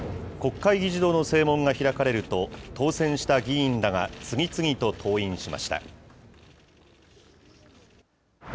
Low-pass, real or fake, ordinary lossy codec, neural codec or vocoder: none; real; none; none